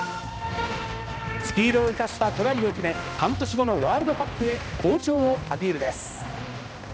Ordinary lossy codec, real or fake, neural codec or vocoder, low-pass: none; fake; codec, 16 kHz, 1 kbps, X-Codec, HuBERT features, trained on balanced general audio; none